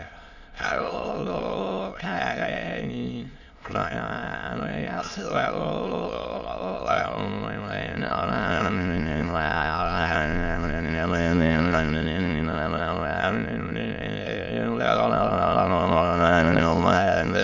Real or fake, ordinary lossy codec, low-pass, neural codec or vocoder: fake; none; 7.2 kHz; autoencoder, 22.05 kHz, a latent of 192 numbers a frame, VITS, trained on many speakers